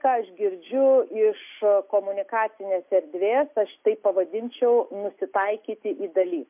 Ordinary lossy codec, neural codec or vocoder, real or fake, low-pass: MP3, 32 kbps; none; real; 3.6 kHz